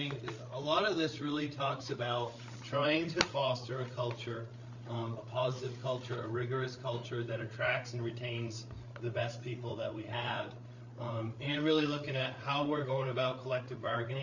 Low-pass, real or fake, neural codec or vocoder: 7.2 kHz; fake; codec, 16 kHz, 8 kbps, FreqCodec, larger model